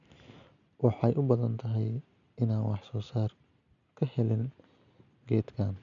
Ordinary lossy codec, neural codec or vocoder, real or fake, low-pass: none; none; real; 7.2 kHz